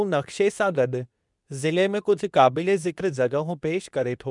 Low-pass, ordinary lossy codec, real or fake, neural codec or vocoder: 10.8 kHz; none; fake; codec, 24 kHz, 0.9 kbps, WavTokenizer, medium speech release version 2